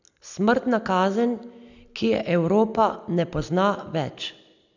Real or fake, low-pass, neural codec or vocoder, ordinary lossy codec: real; 7.2 kHz; none; none